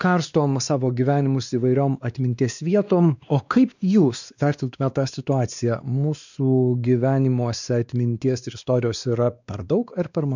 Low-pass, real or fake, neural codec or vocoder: 7.2 kHz; fake; codec, 16 kHz, 2 kbps, X-Codec, WavLM features, trained on Multilingual LibriSpeech